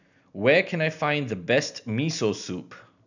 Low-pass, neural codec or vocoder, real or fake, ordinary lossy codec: 7.2 kHz; none; real; none